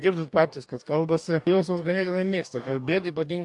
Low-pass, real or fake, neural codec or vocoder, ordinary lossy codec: 10.8 kHz; fake; codec, 44.1 kHz, 2.6 kbps, DAC; AAC, 64 kbps